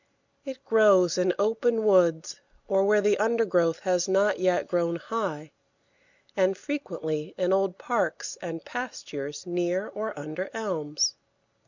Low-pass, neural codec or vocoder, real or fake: 7.2 kHz; none; real